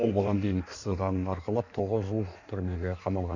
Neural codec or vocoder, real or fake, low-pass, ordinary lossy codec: codec, 16 kHz in and 24 kHz out, 1.1 kbps, FireRedTTS-2 codec; fake; 7.2 kHz; none